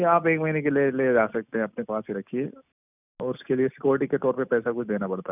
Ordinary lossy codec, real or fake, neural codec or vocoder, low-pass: none; real; none; 3.6 kHz